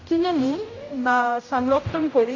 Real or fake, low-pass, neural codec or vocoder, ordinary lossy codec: fake; 7.2 kHz; codec, 16 kHz, 0.5 kbps, X-Codec, HuBERT features, trained on general audio; AAC, 32 kbps